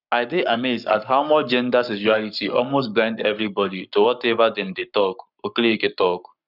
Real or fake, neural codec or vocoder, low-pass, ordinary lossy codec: fake; codec, 44.1 kHz, 7.8 kbps, Pupu-Codec; 5.4 kHz; none